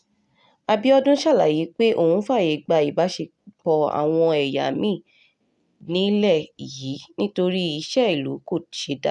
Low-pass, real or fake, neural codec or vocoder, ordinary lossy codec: 10.8 kHz; real; none; none